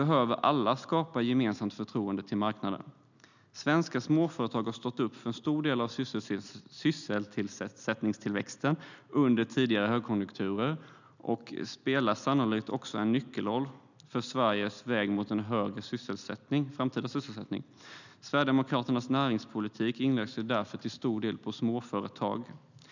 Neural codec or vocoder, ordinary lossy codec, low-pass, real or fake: none; none; 7.2 kHz; real